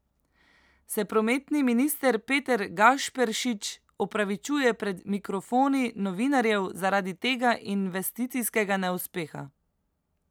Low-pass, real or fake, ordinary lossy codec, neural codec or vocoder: none; real; none; none